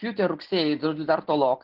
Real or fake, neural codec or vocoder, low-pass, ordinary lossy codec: real; none; 5.4 kHz; Opus, 32 kbps